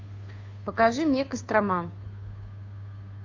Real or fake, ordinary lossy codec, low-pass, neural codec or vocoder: fake; AAC, 48 kbps; 7.2 kHz; codec, 16 kHz, 2 kbps, FunCodec, trained on Chinese and English, 25 frames a second